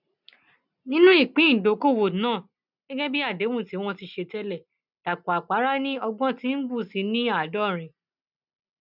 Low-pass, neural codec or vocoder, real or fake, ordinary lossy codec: 5.4 kHz; none; real; none